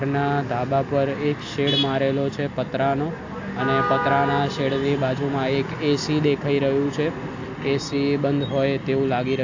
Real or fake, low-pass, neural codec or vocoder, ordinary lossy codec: real; 7.2 kHz; none; AAC, 48 kbps